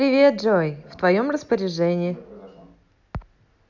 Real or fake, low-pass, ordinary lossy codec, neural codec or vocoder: real; 7.2 kHz; none; none